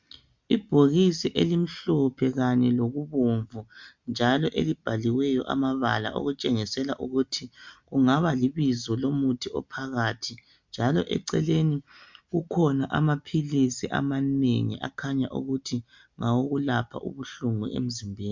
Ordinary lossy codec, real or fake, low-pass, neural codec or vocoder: MP3, 64 kbps; real; 7.2 kHz; none